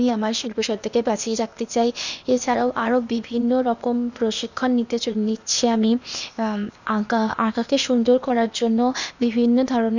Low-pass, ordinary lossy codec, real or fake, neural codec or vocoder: 7.2 kHz; none; fake; codec, 16 kHz, 0.8 kbps, ZipCodec